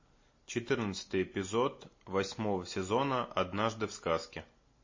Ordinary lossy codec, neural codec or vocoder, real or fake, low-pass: MP3, 32 kbps; none; real; 7.2 kHz